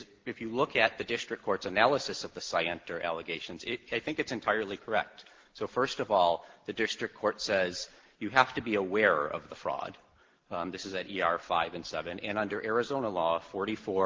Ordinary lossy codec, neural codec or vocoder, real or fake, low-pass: Opus, 16 kbps; none; real; 7.2 kHz